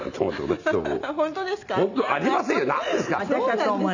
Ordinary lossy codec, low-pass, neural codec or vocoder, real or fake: none; 7.2 kHz; none; real